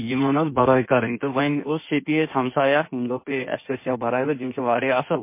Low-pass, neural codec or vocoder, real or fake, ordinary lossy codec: 3.6 kHz; codec, 16 kHz in and 24 kHz out, 1.1 kbps, FireRedTTS-2 codec; fake; MP3, 24 kbps